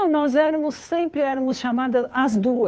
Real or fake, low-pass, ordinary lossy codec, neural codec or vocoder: fake; none; none; codec, 16 kHz, 2 kbps, FunCodec, trained on Chinese and English, 25 frames a second